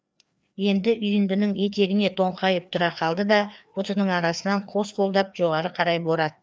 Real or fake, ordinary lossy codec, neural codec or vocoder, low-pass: fake; none; codec, 16 kHz, 2 kbps, FreqCodec, larger model; none